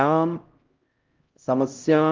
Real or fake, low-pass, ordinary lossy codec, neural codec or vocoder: fake; 7.2 kHz; Opus, 32 kbps; codec, 16 kHz, 0.5 kbps, X-Codec, HuBERT features, trained on LibriSpeech